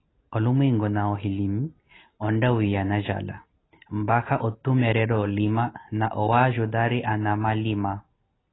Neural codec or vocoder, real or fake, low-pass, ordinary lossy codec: none; real; 7.2 kHz; AAC, 16 kbps